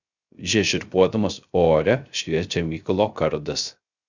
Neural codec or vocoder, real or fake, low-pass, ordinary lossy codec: codec, 16 kHz, 0.3 kbps, FocalCodec; fake; 7.2 kHz; Opus, 64 kbps